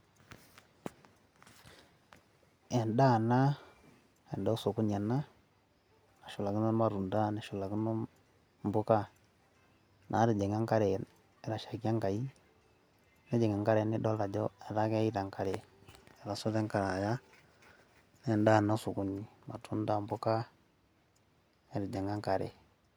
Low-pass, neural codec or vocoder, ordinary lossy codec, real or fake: none; none; none; real